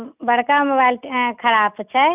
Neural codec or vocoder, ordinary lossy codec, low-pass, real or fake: none; none; 3.6 kHz; real